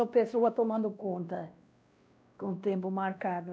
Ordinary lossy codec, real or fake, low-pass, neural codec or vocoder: none; fake; none; codec, 16 kHz, 1 kbps, X-Codec, WavLM features, trained on Multilingual LibriSpeech